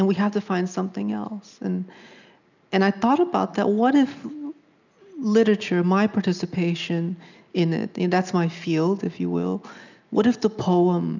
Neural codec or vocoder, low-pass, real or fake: none; 7.2 kHz; real